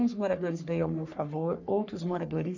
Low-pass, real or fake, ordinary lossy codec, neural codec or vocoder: 7.2 kHz; fake; none; codec, 44.1 kHz, 3.4 kbps, Pupu-Codec